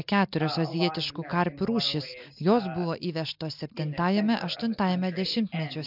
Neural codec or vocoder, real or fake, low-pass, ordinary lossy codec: none; real; 5.4 kHz; MP3, 48 kbps